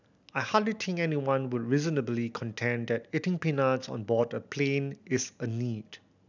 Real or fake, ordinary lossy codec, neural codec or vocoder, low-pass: real; none; none; 7.2 kHz